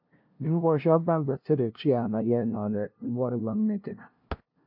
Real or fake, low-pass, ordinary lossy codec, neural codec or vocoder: fake; 5.4 kHz; AAC, 48 kbps; codec, 16 kHz, 0.5 kbps, FunCodec, trained on LibriTTS, 25 frames a second